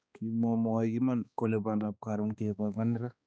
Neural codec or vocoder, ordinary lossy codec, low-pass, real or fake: codec, 16 kHz, 2 kbps, X-Codec, HuBERT features, trained on balanced general audio; none; none; fake